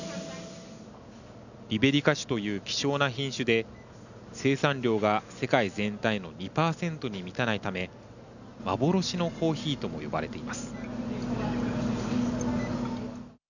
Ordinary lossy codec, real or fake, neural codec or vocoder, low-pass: none; real; none; 7.2 kHz